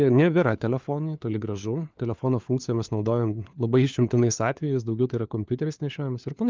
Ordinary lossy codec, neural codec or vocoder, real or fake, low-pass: Opus, 32 kbps; codec, 16 kHz, 4 kbps, FunCodec, trained on LibriTTS, 50 frames a second; fake; 7.2 kHz